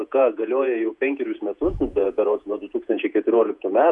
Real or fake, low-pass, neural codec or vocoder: fake; 10.8 kHz; vocoder, 48 kHz, 128 mel bands, Vocos